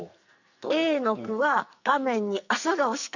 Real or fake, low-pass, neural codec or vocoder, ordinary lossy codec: fake; 7.2 kHz; codec, 44.1 kHz, 2.6 kbps, SNAC; none